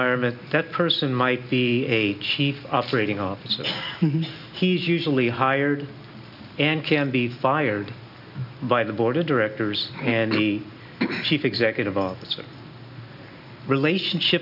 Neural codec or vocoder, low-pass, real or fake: none; 5.4 kHz; real